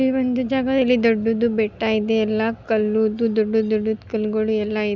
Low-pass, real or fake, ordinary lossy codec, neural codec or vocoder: 7.2 kHz; real; none; none